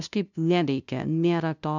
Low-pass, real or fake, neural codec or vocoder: 7.2 kHz; fake; codec, 16 kHz, 0.5 kbps, FunCodec, trained on LibriTTS, 25 frames a second